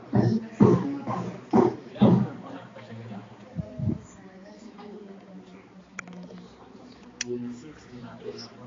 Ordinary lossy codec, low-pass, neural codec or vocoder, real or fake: AAC, 32 kbps; 7.2 kHz; codec, 16 kHz, 4 kbps, X-Codec, HuBERT features, trained on balanced general audio; fake